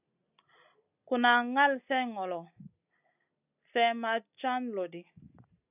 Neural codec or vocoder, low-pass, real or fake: none; 3.6 kHz; real